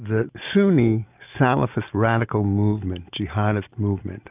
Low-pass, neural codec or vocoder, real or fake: 3.6 kHz; none; real